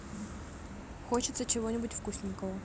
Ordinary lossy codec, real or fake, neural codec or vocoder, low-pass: none; real; none; none